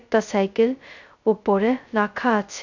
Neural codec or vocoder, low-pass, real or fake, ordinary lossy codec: codec, 16 kHz, 0.2 kbps, FocalCodec; 7.2 kHz; fake; none